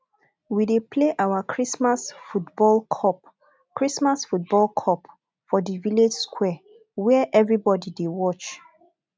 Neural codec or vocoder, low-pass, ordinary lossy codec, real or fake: none; none; none; real